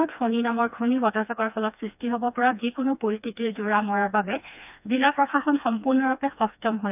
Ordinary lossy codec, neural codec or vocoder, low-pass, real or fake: none; codec, 16 kHz, 2 kbps, FreqCodec, smaller model; 3.6 kHz; fake